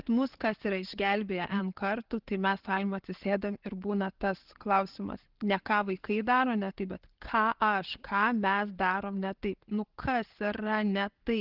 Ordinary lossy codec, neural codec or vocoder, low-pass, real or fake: Opus, 16 kbps; none; 5.4 kHz; real